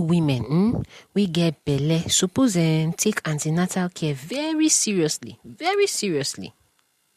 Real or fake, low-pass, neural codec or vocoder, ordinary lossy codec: real; 14.4 kHz; none; MP3, 64 kbps